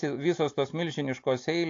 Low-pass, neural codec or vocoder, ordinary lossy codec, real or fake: 7.2 kHz; none; AAC, 48 kbps; real